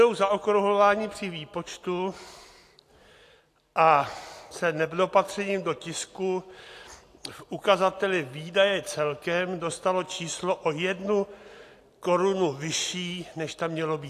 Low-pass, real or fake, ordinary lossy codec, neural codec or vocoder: 14.4 kHz; real; AAC, 64 kbps; none